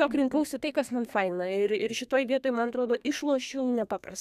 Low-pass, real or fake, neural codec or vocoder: 14.4 kHz; fake; codec, 32 kHz, 1.9 kbps, SNAC